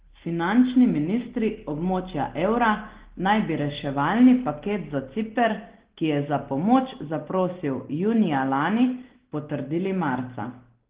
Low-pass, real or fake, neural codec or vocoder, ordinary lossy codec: 3.6 kHz; real; none; Opus, 16 kbps